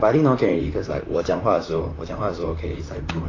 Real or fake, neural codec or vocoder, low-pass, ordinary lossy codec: fake; vocoder, 44.1 kHz, 128 mel bands, Pupu-Vocoder; 7.2 kHz; AAC, 32 kbps